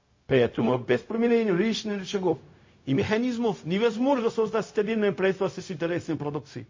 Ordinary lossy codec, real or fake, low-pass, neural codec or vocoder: MP3, 32 kbps; fake; 7.2 kHz; codec, 16 kHz, 0.4 kbps, LongCat-Audio-Codec